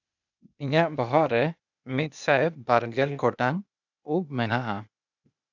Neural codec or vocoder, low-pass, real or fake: codec, 16 kHz, 0.8 kbps, ZipCodec; 7.2 kHz; fake